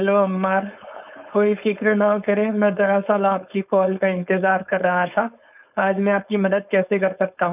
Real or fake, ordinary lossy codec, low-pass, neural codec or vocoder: fake; none; 3.6 kHz; codec, 16 kHz, 4.8 kbps, FACodec